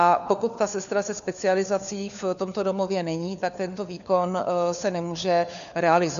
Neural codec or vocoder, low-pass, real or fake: codec, 16 kHz, 4 kbps, FunCodec, trained on LibriTTS, 50 frames a second; 7.2 kHz; fake